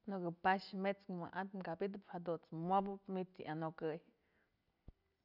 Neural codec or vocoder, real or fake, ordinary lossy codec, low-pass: none; real; none; 5.4 kHz